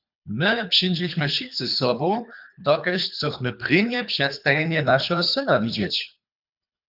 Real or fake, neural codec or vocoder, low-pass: fake; codec, 24 kHz, 3 kbps, HILCodec; 5.4 kHz